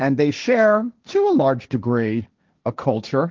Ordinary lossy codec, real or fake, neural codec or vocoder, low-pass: Opus, 32 kbps; fake; codec, 16 kHz, 1.1 kbps, Voila-Tokenizer; 7.2 kHz